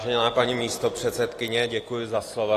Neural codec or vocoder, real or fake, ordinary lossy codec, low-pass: none; real; AAC, 48 kbps; 14.4 kHz